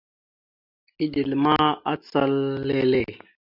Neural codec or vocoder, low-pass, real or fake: none; 5.4 kHz; real